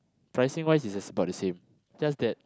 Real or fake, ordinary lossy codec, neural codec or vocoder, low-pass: real; none; none; none